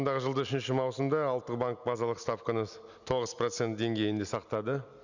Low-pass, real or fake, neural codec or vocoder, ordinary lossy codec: 7.2 kHz; real; none; none